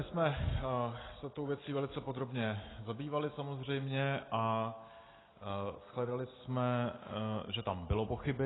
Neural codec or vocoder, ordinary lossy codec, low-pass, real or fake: none; AAC, 16 kbps; 7.2 kHz; real